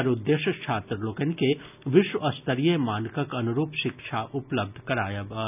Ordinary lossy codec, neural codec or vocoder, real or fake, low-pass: none; none; real; 3.6 kHz